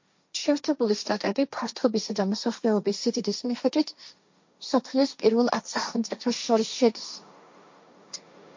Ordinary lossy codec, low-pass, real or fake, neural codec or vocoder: MP3, 48 kbps; 7.2 kHz; fake; codec, 16 kHz, 1.1 kbps, Voila-Tokenizer